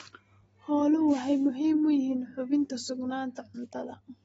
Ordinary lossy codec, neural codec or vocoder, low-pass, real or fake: AAC, 24 kbps; none; 19.8 kHz; real